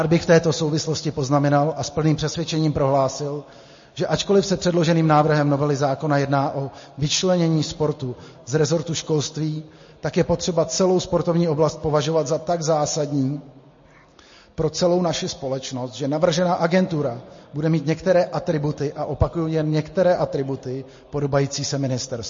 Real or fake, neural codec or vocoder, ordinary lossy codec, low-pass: real; none; MP3, 32 kbps; 7.2 kHz